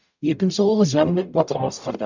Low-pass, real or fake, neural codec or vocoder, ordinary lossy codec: 7.2 kHz; fake; codec, 44.1 kHz, 0.9 kbps, DAC; none